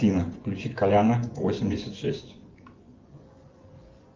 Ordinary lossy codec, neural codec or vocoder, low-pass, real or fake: Opus, 32 kbps; vocoder, 24 kHz, 100 mel bands, Vocos; 7.2 kHz; fake